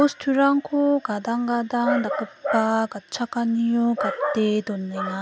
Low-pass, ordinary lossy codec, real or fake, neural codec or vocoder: none; none; real; none